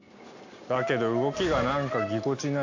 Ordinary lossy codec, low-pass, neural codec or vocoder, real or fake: AAC, 48 kbps; 7.2 kHz; none; real